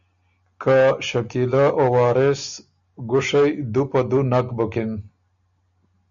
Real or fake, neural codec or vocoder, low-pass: real; none; 7.2 kHz